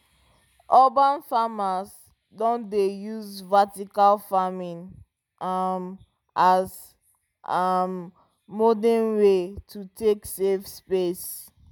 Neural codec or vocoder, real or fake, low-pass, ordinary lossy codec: none; real; none; none